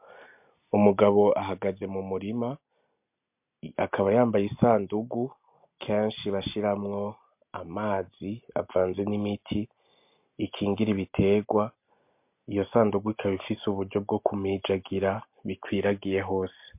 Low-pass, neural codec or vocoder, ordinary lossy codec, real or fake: 3.6 kHz; none; MP3, 32 kbps; real